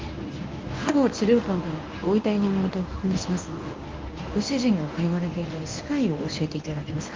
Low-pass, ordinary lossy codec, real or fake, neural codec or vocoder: 7.2 kHz; Opus, 24 kbps; fake; codec, 24 kHz, 0.9 kbps, WavTokenizer, medium speech release version 1